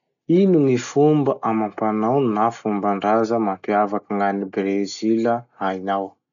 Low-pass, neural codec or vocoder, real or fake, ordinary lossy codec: 7.2 kHz; none; real; none